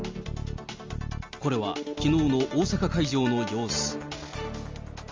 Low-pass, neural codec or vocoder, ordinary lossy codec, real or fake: 7.2 kHz; none; Opus, 32 kbps; real